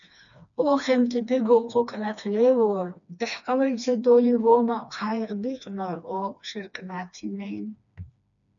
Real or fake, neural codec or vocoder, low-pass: fake; codec, 16 kHz, 2 kbps, FreqCodec, smaller model; 7.2 kHz